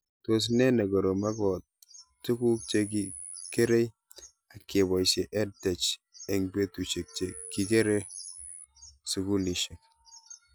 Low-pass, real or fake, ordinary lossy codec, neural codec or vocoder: none; real; none; none